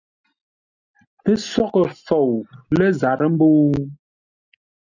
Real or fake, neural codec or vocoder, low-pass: real; none; 7.2 kHz